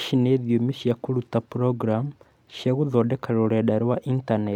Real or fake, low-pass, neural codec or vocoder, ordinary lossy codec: real; 19.8 kHz; none; none